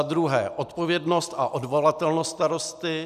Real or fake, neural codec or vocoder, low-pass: real; none; 14.4 kHz